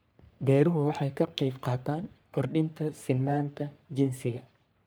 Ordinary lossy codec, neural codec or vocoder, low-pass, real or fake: none; codec, 44.1 kHz, 3.4 kbps, Pupu-Codec; none; fake